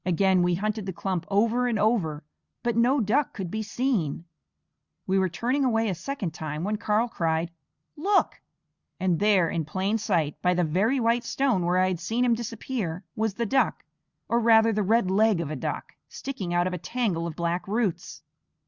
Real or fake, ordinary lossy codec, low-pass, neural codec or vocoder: real; Opus, 64 kbps; 7.2 kHz; none